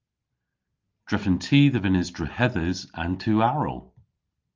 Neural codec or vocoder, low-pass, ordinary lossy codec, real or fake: none; 7.2 kHz; Opus, 32 kbps; real